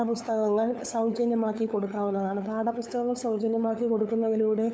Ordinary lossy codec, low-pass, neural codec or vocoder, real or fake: none; none; codec, 16 kHz, 8 kbps, FunCodec, trained on LibriTTS, 25 frames a second; fake